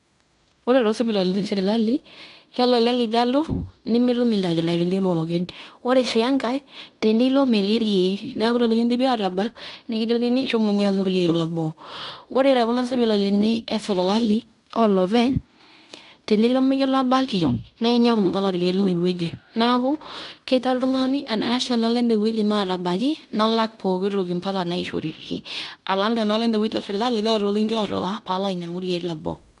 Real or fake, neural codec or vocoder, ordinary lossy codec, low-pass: fake; codec, 16 kHz in and 24 kHz out, 0.9 kbps, LongCat-Audio-Codec, fine tuned four codebook decoder; none; 10.8 kHz